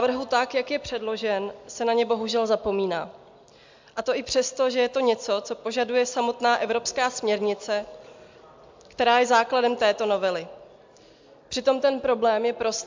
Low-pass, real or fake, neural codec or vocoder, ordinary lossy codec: 7.2 kHz; real; none; MP3, 64 kbps